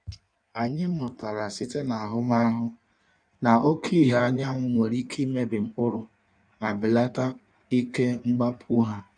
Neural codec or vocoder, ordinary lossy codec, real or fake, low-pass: codec, 16 kHz in and 24 kHz out, 1.1 kbps, FireRedTTS-2 codec; none; fake; 9.9 kHz